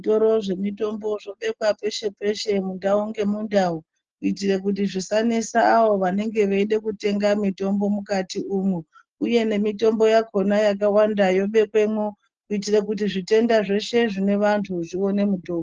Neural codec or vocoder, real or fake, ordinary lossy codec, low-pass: none; real; Opus, 16 kbps; 7.2 kHz